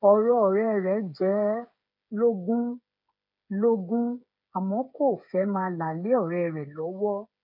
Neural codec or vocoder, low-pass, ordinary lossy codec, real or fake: codec, 16 kHz, 8 kbps, FreqCodec, smaller model; 5.4 kHz; none; fake